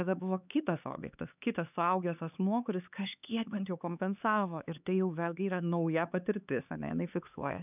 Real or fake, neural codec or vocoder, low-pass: fake; codec, 16 kHz, 4 kbps, X-Codec, HuBERT features, trained on LibriSpeech; 3.6 kHz